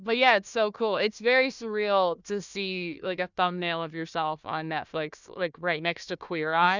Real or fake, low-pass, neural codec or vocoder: fake; 7.2 kHz; codec, 16 kHz, 1 kbps, FunCodec, trained on Chinese and English, 50 frames a second